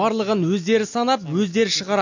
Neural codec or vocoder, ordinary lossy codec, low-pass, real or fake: none; none; 7.2 kHz; real